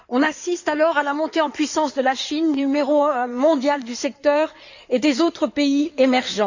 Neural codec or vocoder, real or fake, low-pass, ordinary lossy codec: codec, 16 kHz in and 24 kHz out, 2.2 kbps, FireRedTTS-2 codec; fake; 7.2 kHz; Opus, 64 kbps